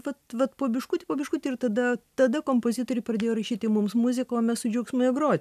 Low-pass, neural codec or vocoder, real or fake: 14.4 kHz; none; real